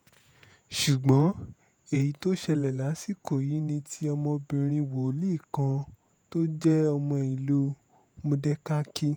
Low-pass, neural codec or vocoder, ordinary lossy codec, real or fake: none; vocoder, 48 kHz, 128 mel bands, Vocos; none; fake